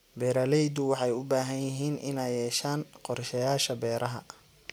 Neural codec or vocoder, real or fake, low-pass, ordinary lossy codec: none; real; none; none